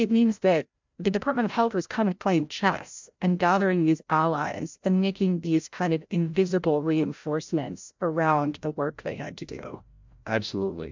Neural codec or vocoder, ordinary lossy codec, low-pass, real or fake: codec, 16 kHz, 0.5 kbps, FreqCodec, larger model; MP3, 64 kbps; 7.2 kHz; fake